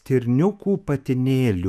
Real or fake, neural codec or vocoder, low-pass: fake; autoencoder, 48 kHz, 128 numbers a frame, DAC-VAE, trained on Japanese speech; 14.4 kHz